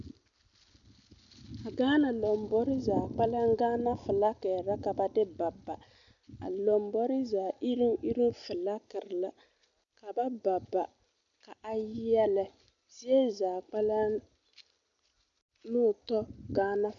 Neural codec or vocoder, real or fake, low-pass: none; real; 7.2 kHz